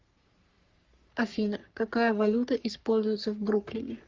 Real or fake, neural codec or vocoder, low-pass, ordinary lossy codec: fake; codec, 44.1 kHz, 3.4 kbps, Pupu-Codec; 7.2 kHz; Opus, 32 kbps